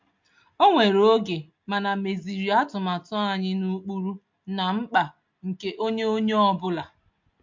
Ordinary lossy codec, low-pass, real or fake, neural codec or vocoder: MP3, 48 kbps; 7.2 kHz; real; none